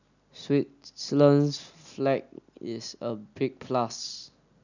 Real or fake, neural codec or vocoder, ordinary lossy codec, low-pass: real; none; none; 7.2 kHz